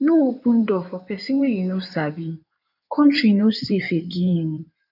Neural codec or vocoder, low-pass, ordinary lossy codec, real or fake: codec, 16 kHz in and 24 kHz out, 2.2 kbps, FireRedTTS-2 codec; 5.4 kHz; none; fake